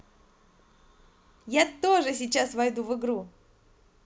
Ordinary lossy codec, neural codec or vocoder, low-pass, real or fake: none; none; none; real